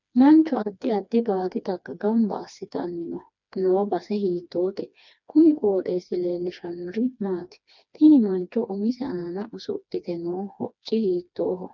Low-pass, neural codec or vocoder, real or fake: 7.2 kHz; codec, 16 kHz, 2 kbps, FreqCodec, smaller model; fake